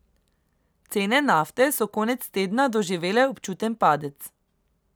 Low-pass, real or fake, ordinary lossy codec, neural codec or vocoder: none; real; none; none